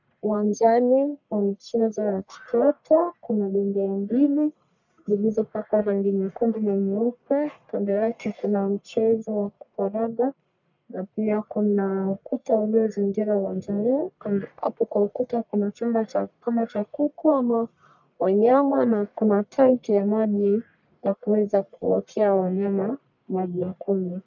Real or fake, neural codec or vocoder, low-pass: fake; codec, 44.1 kHz, 1.7 kbps, Pupu-Codec; 7.2 kHz